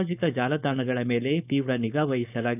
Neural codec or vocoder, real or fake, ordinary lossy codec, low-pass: codec, 16 kHz, 4.8 kbps, FACodec; fake; none; 3.6 kHz